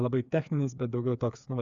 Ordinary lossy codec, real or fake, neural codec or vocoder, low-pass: Opus, 64 kbps; fake; codec, 16 kHz, 4 kbps, FreqCodec, smaller model; 7.2 kHz